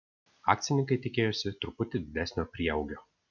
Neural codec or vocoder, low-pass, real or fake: none; 7.2 kHz; real